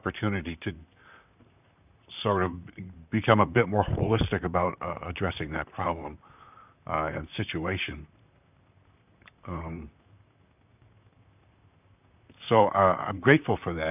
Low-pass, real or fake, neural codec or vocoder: 3.6 kHz; fake; vocoder, 44.1 kHz, 128 mel bands, Pupu-Vocoder